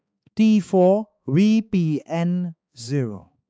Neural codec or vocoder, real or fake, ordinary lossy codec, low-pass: codec, 16 kHz, 4 kbps, X-Codec, HuBERT features, trained on balanced general audio; fake; none; none